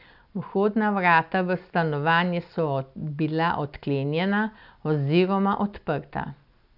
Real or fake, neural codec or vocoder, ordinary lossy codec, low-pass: real; none; none; 5.4 kHz